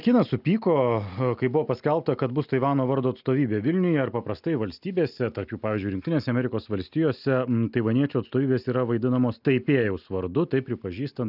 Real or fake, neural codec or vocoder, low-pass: real; none; 5.4 kHz